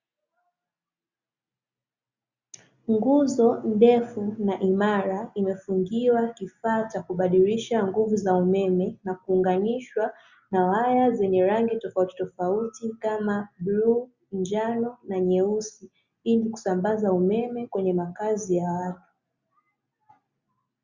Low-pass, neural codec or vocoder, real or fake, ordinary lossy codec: 7.2 kHz; none; real; Opus, 64 kbps